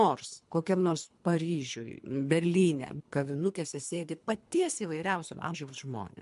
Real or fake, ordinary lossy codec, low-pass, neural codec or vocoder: fake; MP3, 64 kbps; 10.8 kHz; codec, 24 kHz, 3 kbps, HILCodec